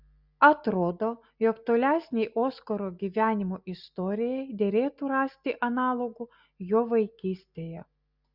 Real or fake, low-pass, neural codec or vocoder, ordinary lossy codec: real; 5.4 kHz; none; Opus, 64 kbps